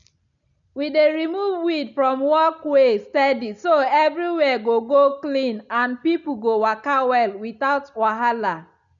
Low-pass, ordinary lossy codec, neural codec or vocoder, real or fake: 7.2 kHz; none; none; real